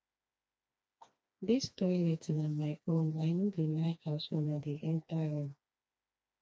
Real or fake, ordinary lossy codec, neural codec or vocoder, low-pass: fake; none; codec, 16 kHz, 2 kbps, FreqCodec, smaller model; none